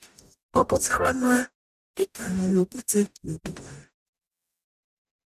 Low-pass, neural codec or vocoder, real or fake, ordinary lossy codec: 14.4 kHz; codec, 44.1 kHz, 0.9 kbps, DAC; fake; none